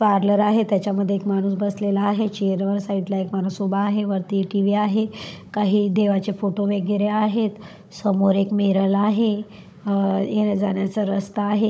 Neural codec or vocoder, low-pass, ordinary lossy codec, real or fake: codec, 16 kHz, 16 kbps, FunCodec, trained on Chinese and English, 50 frames a second; none; none; fake